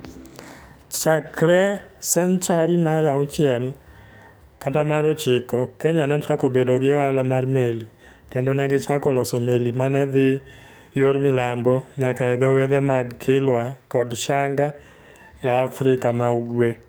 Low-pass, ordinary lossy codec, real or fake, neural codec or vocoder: none; none; fake; codec, 44.1 kHz, 2.6 kbps, SNAC